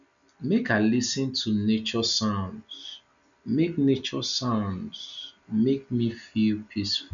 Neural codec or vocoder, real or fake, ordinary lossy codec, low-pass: none; real; none; 7.2 kHz